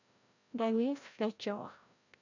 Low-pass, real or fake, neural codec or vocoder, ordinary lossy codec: 7.2 kHz; fake; codec, 16 kHz, 0.5 kbps, FreqCodec, larger model; none